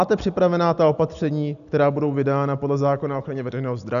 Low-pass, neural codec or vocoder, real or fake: 7.2 kHz; none; real